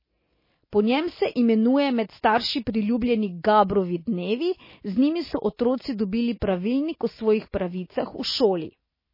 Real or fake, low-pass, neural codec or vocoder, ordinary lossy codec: real; 5.4 kHz; none; MP3, 24 kbps